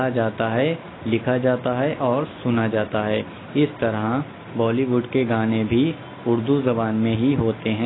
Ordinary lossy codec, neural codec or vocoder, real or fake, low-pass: AAC, 16 kbps; none; real; 7.2 kHz